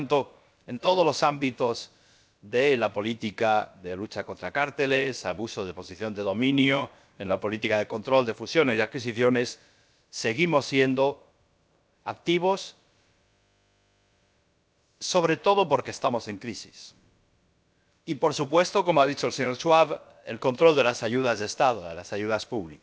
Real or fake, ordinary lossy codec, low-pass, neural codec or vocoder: fake; none; none; codec, 16 kHz, about 1 kbps, DyCAST, with the encoder's durations